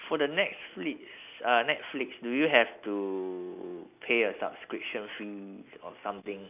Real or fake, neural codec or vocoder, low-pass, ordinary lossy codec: real; none; 3.6 kHz; none